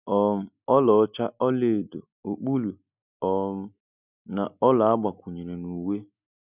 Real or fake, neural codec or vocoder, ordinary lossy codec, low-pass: real; none; none; 3.6 kHz